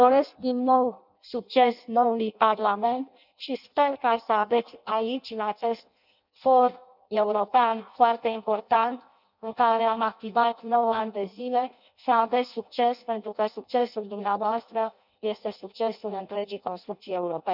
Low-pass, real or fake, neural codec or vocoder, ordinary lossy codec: 5.4 kHz; fake; codec, 16 kHz in and 24 kHz out, 0.6 kbps, FireRedTTS-2 codec; none